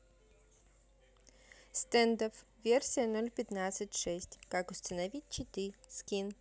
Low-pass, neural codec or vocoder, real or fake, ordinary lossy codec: none; none; real; none